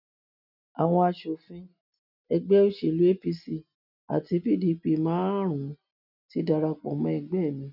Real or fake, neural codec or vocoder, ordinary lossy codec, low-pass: real; none; none; 5.4 kHz